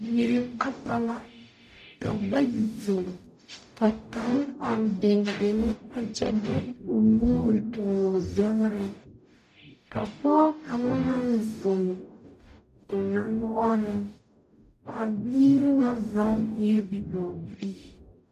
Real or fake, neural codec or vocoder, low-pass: fake; codec, 44.1 kHz, 0.9 kbps, DAC; 14.4 kHz